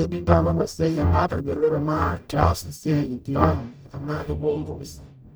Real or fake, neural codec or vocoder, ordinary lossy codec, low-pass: fake; codec, 44.1 kHz, 0.9 kbps, DAC; none; none